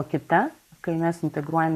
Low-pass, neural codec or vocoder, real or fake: 14.4 kHz; codec, 44.1 kHz, 7.8 kbps, Pupu-Codec; fake